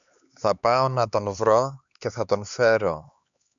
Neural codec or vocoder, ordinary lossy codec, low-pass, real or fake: codec, 16 kHz, 4 kbps, X-Codec, HuBERT features, trained on LibriSpeech; MP3, 96 kbps; 7.2 kHz; fake